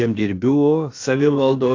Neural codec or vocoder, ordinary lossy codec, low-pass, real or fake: codec, 16 kHz, about 1 kbps, DyCAST, with the encoder's durations; AAC, 48 kbps; 7.2 kHz; fake